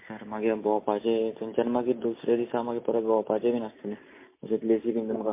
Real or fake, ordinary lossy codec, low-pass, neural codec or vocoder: real; MP3, 24 kbps; 3.6 kHz; none